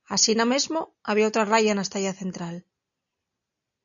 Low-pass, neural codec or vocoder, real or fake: 7.2 kHz; none; real